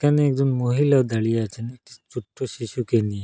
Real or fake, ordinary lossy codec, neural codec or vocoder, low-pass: real; none; none; none